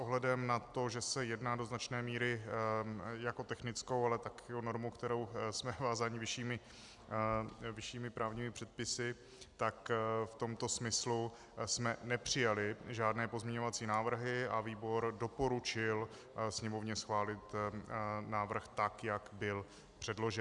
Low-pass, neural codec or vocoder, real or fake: 10.8 kHz; none; real